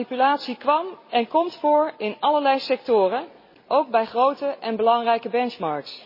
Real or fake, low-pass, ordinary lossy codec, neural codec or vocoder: real; 5.4 kHz; MP3, 24 kbps; none